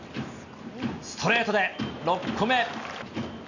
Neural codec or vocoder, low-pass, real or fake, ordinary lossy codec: none; 7.2 kHz; real; none